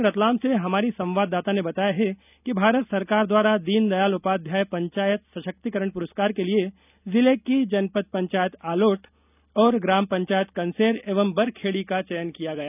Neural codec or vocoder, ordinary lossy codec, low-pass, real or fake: none; none; 3.6 kHz; real